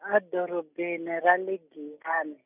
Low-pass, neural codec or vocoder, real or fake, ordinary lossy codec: 3.6 kHz; none; real; none